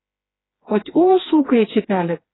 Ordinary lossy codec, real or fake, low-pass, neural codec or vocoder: AAC, 16 kbps; fake; 7.2 kHz; codec, 16 kHz, 2 kbps, FreqCodec, smaller model